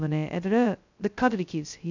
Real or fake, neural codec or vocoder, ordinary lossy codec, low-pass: fake; codec, 16 kHz, 0.2 kbps, FocalCodec; none; 7.2 kHz